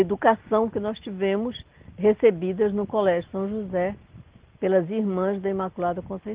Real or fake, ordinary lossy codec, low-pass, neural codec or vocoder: real; Opus, 16 kbps; 3.6 kHz; none